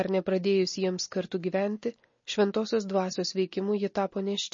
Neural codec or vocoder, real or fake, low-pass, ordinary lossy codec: none; real; 7.2 kHz; MP3, 32 kbps